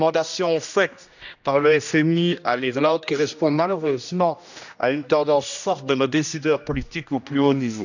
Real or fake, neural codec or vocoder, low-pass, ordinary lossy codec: fake; codec, 16 kHz, 1 kbps, X-Codec, HuBERT features, trained on general audio; 7.2 kHz; none